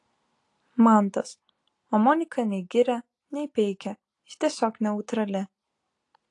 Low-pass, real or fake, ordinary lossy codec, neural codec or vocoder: 10.8 kHz; real; AAC, 48 kbps; none